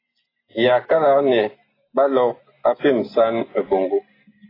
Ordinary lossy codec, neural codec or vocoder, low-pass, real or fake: AAC, 24 kbps; none; 5.4 kHz; real